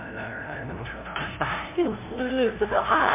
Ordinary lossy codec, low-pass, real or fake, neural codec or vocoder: MP3, 32 kbps; 3.6 kHz; fake; codec, 16 kHz, 0.5 kbps, FunCodec, trained on LibriTTS, 25 frames a second